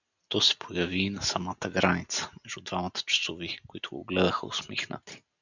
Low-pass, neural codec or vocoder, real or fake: 7.2 kHz; none; real